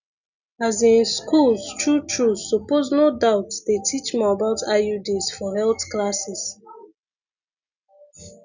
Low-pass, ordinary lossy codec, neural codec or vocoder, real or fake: 7.2 kHz; none; none; real